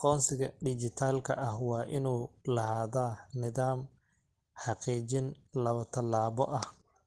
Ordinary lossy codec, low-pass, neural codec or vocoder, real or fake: Opus, 16 kbps; 10.8 kHz; none; real